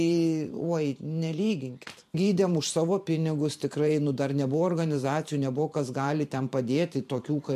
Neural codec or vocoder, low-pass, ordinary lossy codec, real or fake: vocoder, 44.1 kHz, 128 mel bands every 512 samples, BigVGAN v2; 14.4 kHz; MP3, 64 kbps; fake